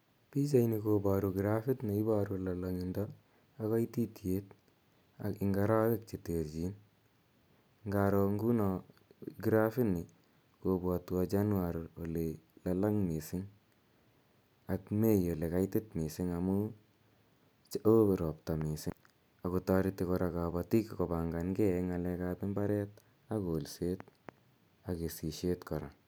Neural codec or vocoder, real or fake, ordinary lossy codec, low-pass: none; real; none; none